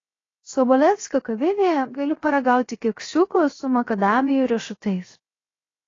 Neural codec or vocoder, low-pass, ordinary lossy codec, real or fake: codec, 16 kHz, 0.7 kbps, FocalCodec; 7.2 kHz; AAC, 32 kbps; fake